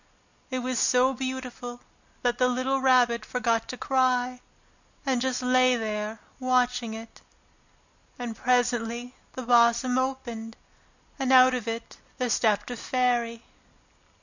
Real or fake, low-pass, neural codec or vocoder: real; 7.2 kHz; none